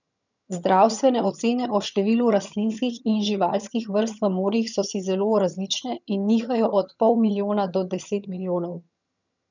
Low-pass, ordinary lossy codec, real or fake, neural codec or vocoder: 7.2 kHz; none; fake; vocoder, 22.05 kHz, 80 mel bands, HiFi-GAN